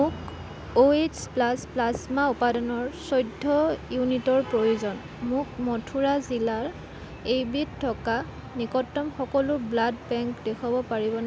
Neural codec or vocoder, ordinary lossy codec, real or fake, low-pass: none; none; real; none